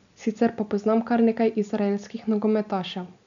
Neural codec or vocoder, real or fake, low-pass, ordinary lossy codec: none; real; 7.2 kHz; none